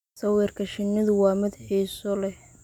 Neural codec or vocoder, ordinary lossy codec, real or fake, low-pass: none; none; real; 19.8 kHz